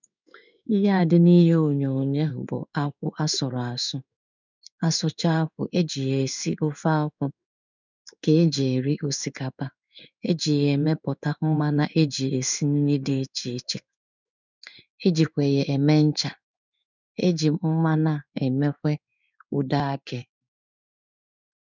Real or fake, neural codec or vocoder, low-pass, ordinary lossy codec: fake; codec, 16 kHz in and 24 kHz out, 1 kbps, XY-Tokenizer; 7.2 kHz; none